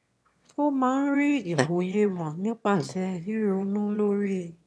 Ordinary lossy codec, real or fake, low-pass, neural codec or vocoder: none; fake; none; autoencoder, 22.05 kHz, a latent of 192 numbers a frame, VITS, trained on one speaker